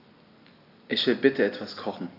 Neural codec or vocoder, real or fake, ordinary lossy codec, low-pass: none; real; AAC, 32 kbps; 5.4 kHz